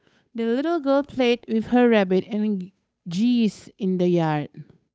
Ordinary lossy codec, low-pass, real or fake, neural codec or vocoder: none; none; fake; codec, 16 kHz, 8 kbps, FunCodec, trained on Chinese and English, 25 frames a second